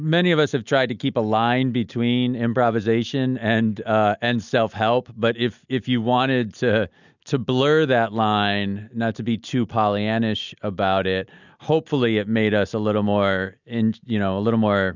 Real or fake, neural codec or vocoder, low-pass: real; none; 7.2 kHz